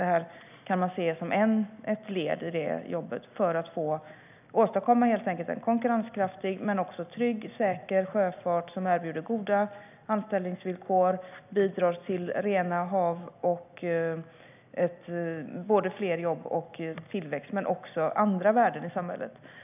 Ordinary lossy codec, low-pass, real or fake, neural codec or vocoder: none; 3.6 kHz; real; none